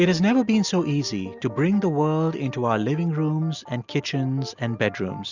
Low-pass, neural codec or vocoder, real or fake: 7.2 kHz; none; real